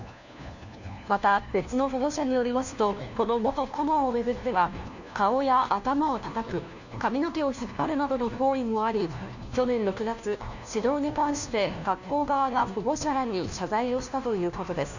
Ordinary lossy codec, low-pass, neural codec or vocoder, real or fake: none; 7.2 kHz; codec, 16 kHz, 1 kbps, FunCodec, trained on LibriTTS, 50 frames a second; fake